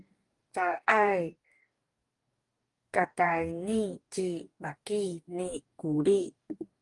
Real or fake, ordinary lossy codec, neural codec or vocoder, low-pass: fake; Opus, 32 kbps; codec, 44.1 kHz, 2.6 kbps, DAC; 10.8 kHz